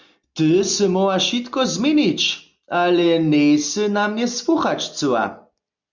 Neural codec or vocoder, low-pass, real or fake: none; 7.2 kHz; real